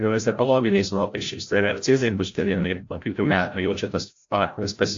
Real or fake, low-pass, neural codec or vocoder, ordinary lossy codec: fake; 7.2 kHz; codec, 16 kHz, 0.5 kbps, FreqCodec, larger model; AAC, 48 kbps